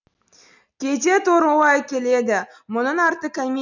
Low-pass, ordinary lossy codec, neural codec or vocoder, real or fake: 7.2 kHz; none; none; real